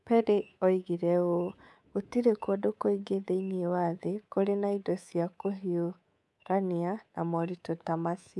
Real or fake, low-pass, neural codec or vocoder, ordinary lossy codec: fake; none; codec, 24 kHz, 3.1 kbps, DualCodec; none